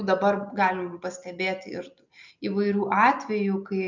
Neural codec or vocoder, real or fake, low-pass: none; real; 7.2 kHz